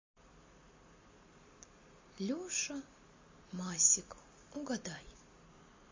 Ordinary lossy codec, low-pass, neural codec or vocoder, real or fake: MP3, 32 kbps; 7.2 kHz; none; real